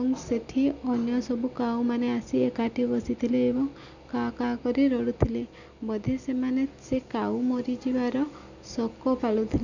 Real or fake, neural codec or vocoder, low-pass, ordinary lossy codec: real; none; 7.2 kHz; none